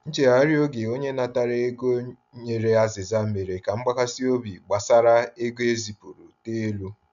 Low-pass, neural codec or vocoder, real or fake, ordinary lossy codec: 7.2 kHz; none; real; none